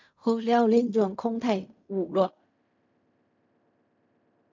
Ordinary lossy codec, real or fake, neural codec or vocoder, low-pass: MP3, 64 kbps; fake; codec, 16 kHz in and 24 kHz out, 0.4 kbps, LongCat-Audio-Codec, fine tuned four codebook decoder; 7.2 kHz